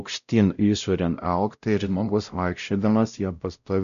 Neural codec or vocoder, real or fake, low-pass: codec, 16 kHz, 0.5 kbps, X-Codec, WavLM features, trained on Multilingual LibriSpeech; fake; 7.2 kHz